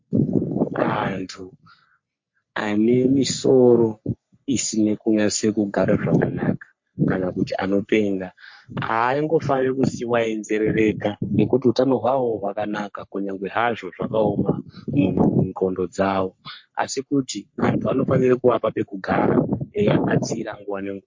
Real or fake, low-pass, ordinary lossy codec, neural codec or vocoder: fake; 7.2 kHz; MP3, 48 kbps; codec, 44.1 kHz, 3.4 kbps, Pupu-Codec